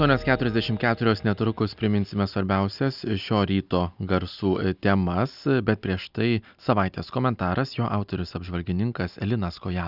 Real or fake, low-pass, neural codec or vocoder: real; 5.4 kHz; none